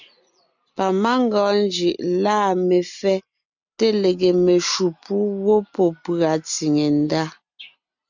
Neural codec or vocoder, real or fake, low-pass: none; real; 7.2 kHz